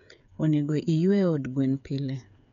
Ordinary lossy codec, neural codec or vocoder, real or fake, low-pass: none; codec, 16 kHz, 8 kbps, FreqCodec, smaller model; fake; 7.2 kHz